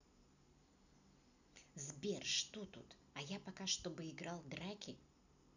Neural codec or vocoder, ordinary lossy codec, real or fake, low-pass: none; none; real; 7.2 kHz